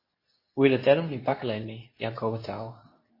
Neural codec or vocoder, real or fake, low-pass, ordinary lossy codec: codec, 24 kHz, 0.9 kbps, WavTokenizer, medium speech release version 2; fake; 5.4 kHz; MP3, 24 kbps